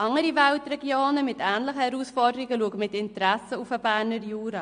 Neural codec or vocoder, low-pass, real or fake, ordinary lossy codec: none; 9.9 kHz; real; MP3, 48 kbps